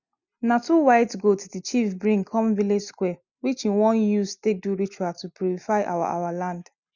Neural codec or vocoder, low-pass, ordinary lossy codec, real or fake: none; 7.2 kHz; none; real